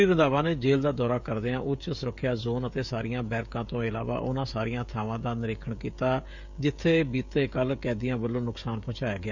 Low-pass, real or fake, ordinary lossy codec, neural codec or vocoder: 7.2 kHz; fake; none; codec, 16 kHz, 16 kbps, FreqCodec, smaller model